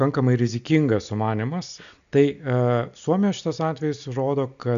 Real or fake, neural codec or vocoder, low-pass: real; none; 7.2 kHz